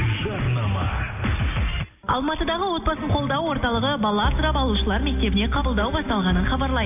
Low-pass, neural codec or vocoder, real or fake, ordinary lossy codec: 3.6 kHz; none; real; AAC, 32 kbps